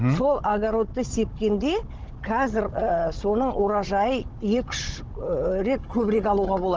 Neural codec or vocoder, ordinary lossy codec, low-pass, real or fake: codec, 16 kHz, 16 kbps, FunCodec, trained on Chinese and English, 50 frames a second; Opus, 16 kbps; 7.2 kHz; fake